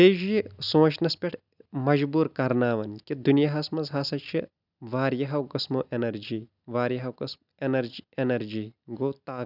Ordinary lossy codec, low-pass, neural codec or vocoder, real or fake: none; 5.4 kHz; none; real